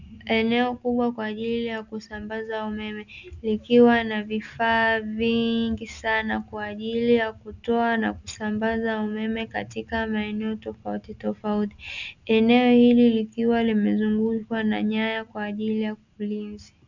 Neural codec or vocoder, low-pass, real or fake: none; 7.2 kHz; real